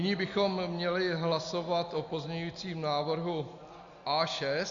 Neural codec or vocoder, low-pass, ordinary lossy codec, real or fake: none; 7.2 kHz; Opus, 64 kbps; real